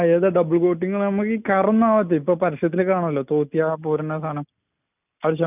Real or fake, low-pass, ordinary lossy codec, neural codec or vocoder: real; 3.6 kHz; none; none